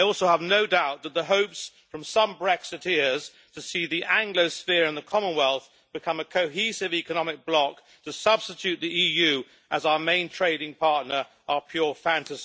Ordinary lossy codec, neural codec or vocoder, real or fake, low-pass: none; none; real; none